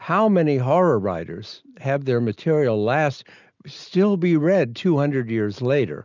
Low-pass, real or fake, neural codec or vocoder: 7.2 kHz; real; none